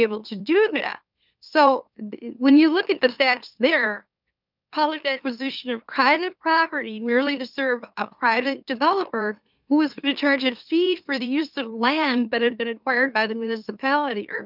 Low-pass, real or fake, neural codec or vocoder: 5.4 kHz; fake; autoencoder, 44.1 kHz, a latent of 192 numbers a frame, MeloTTS